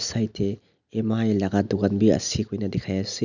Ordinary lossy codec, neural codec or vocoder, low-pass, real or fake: none; none; 7.2 kHz; real